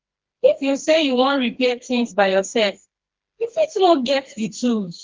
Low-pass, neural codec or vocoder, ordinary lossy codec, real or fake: 7.2 kHz; codec, 16 kHz, 2 kbps, FreqCodec, smaller model; Opus, 16 kbps; fake